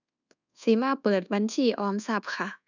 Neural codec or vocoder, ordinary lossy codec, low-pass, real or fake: codec, 24 kHz, 1.2 kbps, DualCodec; none; 7.2 kHz; fake